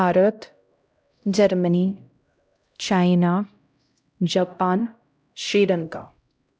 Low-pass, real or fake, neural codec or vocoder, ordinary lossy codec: none; fake; codec, 16 kHz, 0.5 kbps, X-Codec, HuBERT features, trained on LibriSpeech; none